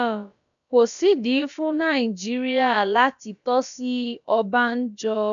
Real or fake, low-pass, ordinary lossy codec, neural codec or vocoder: fake; 7.2 kHz; none; codec, 16 kHz, about 1 kbps, DyCAST, with the encoder's durations